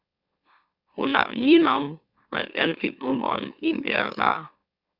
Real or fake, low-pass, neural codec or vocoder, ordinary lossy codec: fake; 5.4 kHz; autoencoder, 44.1 kHz, a latent of 192 numbers a frame, MeloTTS; Opus, 64 kbps